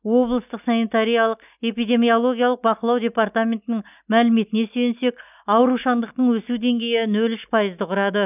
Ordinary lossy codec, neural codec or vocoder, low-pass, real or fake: none; none; 3.6 kHz; real